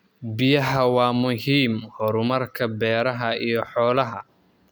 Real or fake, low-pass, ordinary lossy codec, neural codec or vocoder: real; none; none; none